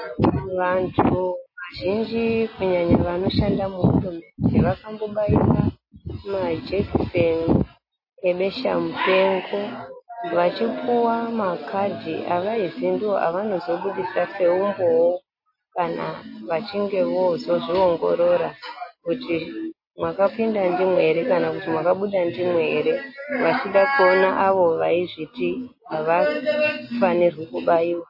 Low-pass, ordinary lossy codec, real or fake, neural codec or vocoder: 5.4 kHz; MP3, 24 kbps; real; none